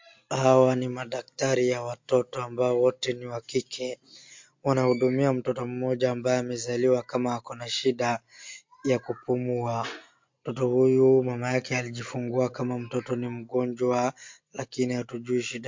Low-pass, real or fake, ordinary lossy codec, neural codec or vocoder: 7.2 kHz; real; MP3, 48 kbps; none